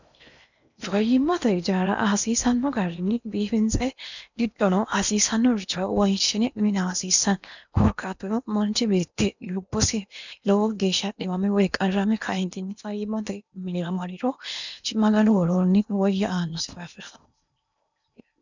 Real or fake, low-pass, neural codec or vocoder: fake; 7.2 kHz; codec, 16 kHz in and 24 kHz out, 0.8 kbps, FocalCodec, streaming, 65536 codes